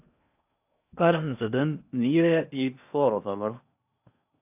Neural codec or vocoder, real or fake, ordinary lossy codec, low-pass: codec, 16 kHz in and 24 kHz out, 0.8 kbps, FocalCodec, streaming, 65536 codes; fake; AAC, 32 kbps; 3.6 kHz